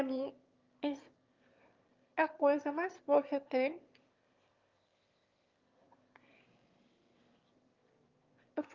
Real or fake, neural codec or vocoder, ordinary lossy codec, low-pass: fake; autoencoder, 22.05 kHz, a latent of 192 numbers a frame, VITS, trained on one speaker; Opus, 32 kbps; 7.2 kHz